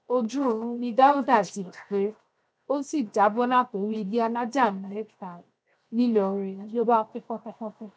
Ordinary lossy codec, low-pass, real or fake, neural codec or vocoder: none; none; fake; codec, 16 kHz, 0.7 kbps, FocalCodec